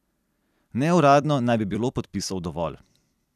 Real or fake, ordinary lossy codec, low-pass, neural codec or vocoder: fake; none; 14.4 kHz; vocoder, 44.1 kHz, 128 mel bands every 256 samples, BigVGAN v2